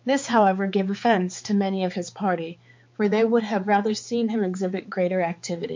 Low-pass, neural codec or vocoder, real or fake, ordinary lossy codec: 7.2 kHz; codec, 16 kHz, 4 kbps, X-Codec, HuBERT features, trained on balanced general audio; fake; MP3, 48 kbps